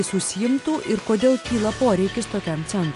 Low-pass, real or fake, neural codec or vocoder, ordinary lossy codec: 10.8 kHz; real; none; AAC, 48 kbps